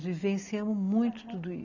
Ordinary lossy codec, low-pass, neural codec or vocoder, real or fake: none; 7.2 kHz; none; real